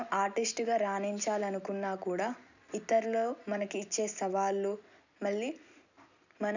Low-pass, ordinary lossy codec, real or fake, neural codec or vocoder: 7.2 kHz; none; real; none